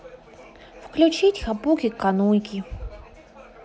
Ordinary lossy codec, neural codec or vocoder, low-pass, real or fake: none; none; none; real